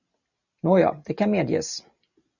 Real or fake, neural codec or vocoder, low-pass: real; none; 7.2 kHz